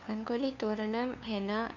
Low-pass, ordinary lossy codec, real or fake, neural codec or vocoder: 7.2 kHz; none; fake; codec, 16 kHz, 2 kbps, FunCodec, trained on LibriTTS, 25 frames a second